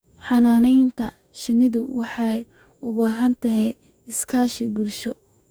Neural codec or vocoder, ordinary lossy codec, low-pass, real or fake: codec, 44.1 kHz, 2.6 kbps, DAC; none; none; fake